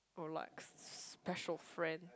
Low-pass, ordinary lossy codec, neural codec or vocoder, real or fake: none; none; none; real